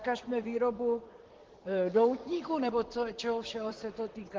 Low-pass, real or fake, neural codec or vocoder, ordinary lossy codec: 7.2 kHz; fake; vocoder, 44.1 kHz, 128 mel bands, Pupu-Vocoder; Opus, 16 kbps